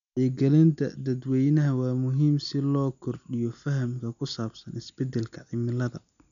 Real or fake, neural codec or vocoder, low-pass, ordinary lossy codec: real; none; 7.2 kHz; none